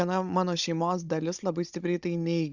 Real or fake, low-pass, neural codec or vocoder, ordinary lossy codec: fake; 7.2 kHz; codec, 16 kHz, 16 kbps, FunCodec, trained on Chinese and English, 50 frames a second; Opus, 64 kbps